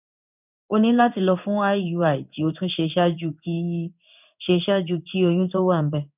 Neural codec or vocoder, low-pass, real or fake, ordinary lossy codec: codec, 16 kHz in and 24 kHz out, 1 kbps, XY-Tokenizer; 3.6 kHz; fake; none